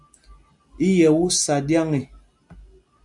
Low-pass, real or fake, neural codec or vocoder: 10.8 kHz; real; none